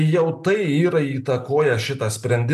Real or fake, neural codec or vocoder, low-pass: real; none; 14.4 kHz